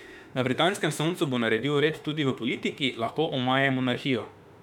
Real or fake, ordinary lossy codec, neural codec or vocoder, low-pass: fake; none; autoencoder, 48 kHz, 32 numbers a frame, DAC-VAE, trained on Japanese speech; 19.8 kHz